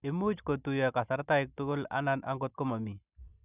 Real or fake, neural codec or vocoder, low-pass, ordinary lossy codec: real; none; 3.6 kHz; none